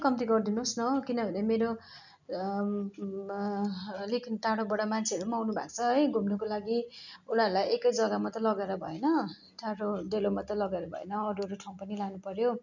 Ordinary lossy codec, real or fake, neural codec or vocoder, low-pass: none; real; none; 7.2 kHz